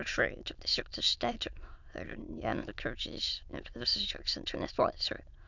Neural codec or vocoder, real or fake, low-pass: autoencoder, 22.05 kHz, a latent of 192 numbers a frame, VITS, trained on many speakers; fake; 7.2 kHz